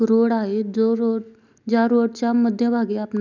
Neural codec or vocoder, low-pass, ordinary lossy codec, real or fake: none; 7.2 kHz; none; real